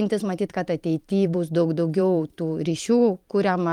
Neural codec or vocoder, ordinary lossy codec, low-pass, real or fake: vocoder, 44.1 kHz, 128 mel bands every 512 samples, BigVGAN v2; Opus, 32 kbps; 19.8 kHz; fake